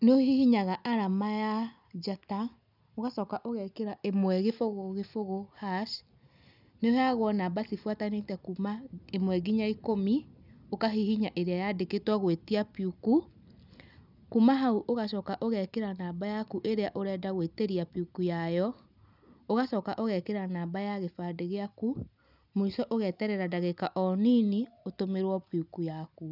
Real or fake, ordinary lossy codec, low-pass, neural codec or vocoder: real; none; 5.4 kHz; none